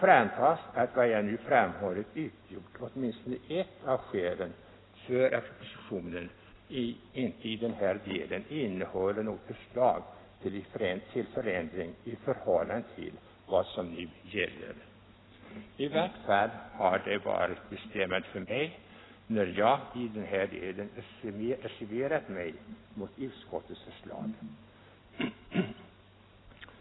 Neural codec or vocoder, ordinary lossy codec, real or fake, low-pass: none; AAC, 16 kbps; real; 7.2 kHz